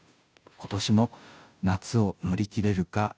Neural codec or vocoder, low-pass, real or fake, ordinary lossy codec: codec, 16 kHz, 0.5 kbps, FunCodec, trained on Chinese and English, 25 frames a second; none; fake; none